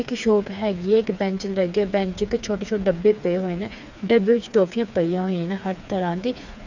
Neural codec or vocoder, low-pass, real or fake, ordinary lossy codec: codec, 16 kHz, 4 kbps, FreqCodec, smaller model; 7.2 kHz; fake; none